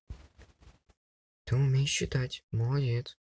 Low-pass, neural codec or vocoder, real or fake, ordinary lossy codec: none; none; real; none